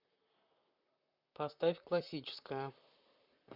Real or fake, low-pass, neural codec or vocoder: fake; 5.4 kHz; vocoder, 44.1 kHz, 128 mel bands, Pupu-Vocoder